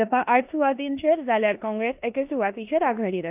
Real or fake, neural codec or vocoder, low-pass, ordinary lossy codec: fake; codec, 16 kHz in and 24 kHz out, 0.9 kbps, LongCat-Audio-Codec, four codebook decoder; 3.6 kHz; AAC, 32 kbps